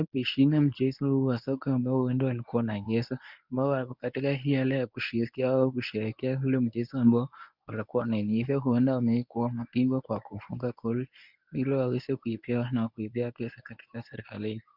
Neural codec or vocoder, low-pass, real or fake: codec, 24 kHz, 0.9 kbps, WavTokenizer, medium speech release version 1; 5.4 kHz; fake